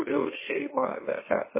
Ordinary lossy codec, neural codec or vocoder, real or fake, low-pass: MP3, 16 kbps; autoencoder, 44.1 kHz, a latent of 192 numbers a frame, MeloTTS; fake; 3.6 kHz